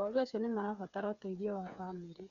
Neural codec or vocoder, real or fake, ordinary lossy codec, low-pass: codec, 16 kHz, 8 kbps, FreqCodec, smaller model; fake; none; 7.2 kHz